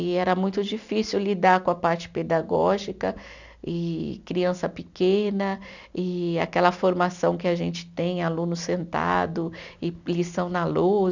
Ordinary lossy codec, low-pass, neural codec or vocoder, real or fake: none; 7.2 kHz; none; real